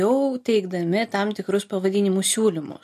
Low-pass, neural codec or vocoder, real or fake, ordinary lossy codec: 14.4 kHz; none; real; MP3, 64 kbps